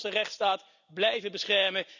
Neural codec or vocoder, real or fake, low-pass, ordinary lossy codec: none; real; 7.2 kHz; none